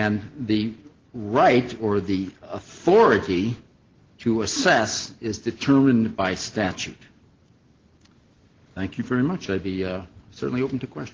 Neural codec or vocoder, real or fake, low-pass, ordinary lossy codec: none; real; 7.2 kHz; Opus, 16 kbps